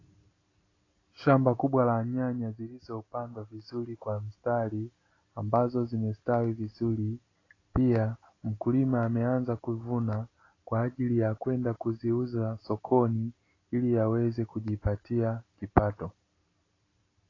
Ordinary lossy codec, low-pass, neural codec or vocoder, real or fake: AAC, 32 kbps; 7.2 kHz; none; real